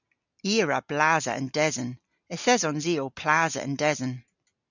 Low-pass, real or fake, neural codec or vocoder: 7.2 kHz; real; none